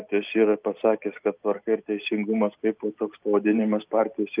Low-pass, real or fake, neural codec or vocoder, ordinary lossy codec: 3.6 kHz; real; none; Opus, 32 kbps